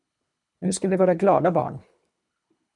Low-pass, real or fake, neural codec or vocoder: 10.8 kHz; fake; codec, 24 kHz, 3 kbps, HILCodec